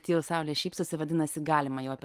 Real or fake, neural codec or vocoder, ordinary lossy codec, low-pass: real; none; Opus, 32 kbps; 14.4 kHz